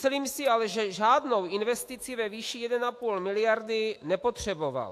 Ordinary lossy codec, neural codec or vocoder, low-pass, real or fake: AAC, 64 kbps; autoencoder, 48 kHz, 128 numbers a frame, DAC-VAE, trained on Japanese speech; 14.4 kHz; fake